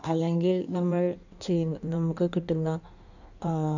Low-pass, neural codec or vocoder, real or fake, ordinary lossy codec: 7.2 kHz; codec, 16 kHz in and 24 kHz out, 1.1 kbps, FireRedTTS-2 codec; fake; none